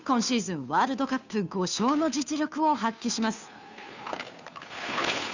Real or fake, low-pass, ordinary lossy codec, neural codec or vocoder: fake; 7.2 kHz; none; codec, 16 kHz, 2 kbps, FunCodec, trained on Chinese and English, 25 frames a second